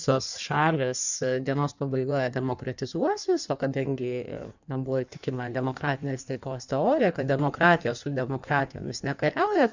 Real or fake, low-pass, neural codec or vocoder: fake; 7.2 kHz; codec, 16 kHz in and 24 kHz out, 1.1 kbps, FireRedTTS-2 codec